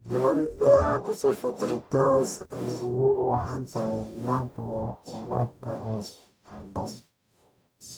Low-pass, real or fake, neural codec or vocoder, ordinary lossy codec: none; fake; codec, 44.1 kHz, 0.9 kbps, DAC; none